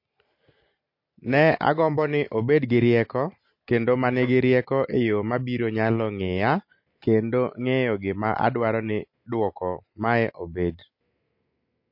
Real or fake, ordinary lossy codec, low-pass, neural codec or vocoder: real; MP3, 32 kbps; 5.4 kHz; none